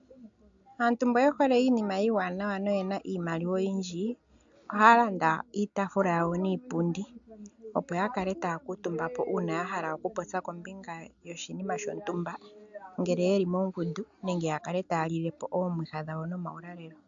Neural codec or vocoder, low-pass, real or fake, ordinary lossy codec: none; 7.2 kHz; real; AAC, 64 kbps